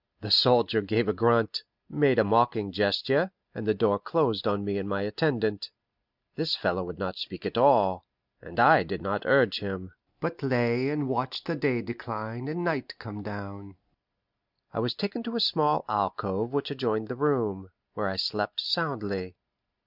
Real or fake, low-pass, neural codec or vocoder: real; 5.4 kHz; none